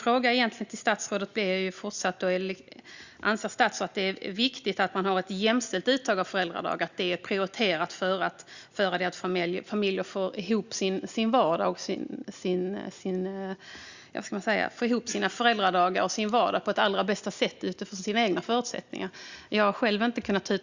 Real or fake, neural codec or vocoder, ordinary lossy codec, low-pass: real; none; Opus, 64 kbps; 7.2 kHz